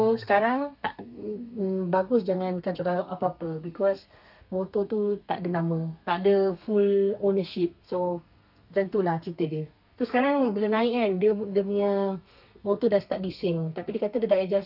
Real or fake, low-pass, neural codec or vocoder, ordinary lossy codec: fake; 5.4 kHz; codec, 32 kHz, 1.9 kbps, SNAC; none